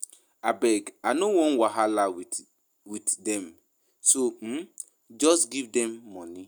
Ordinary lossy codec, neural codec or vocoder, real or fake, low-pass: none; none; real; none